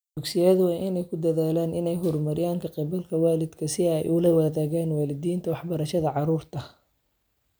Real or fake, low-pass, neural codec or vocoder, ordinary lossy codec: real; none; none; none